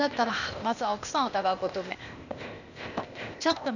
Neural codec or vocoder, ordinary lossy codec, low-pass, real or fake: codec, 16 kHz, 0.8 kbps, ZipCodec; none; 7.2 kHz; fake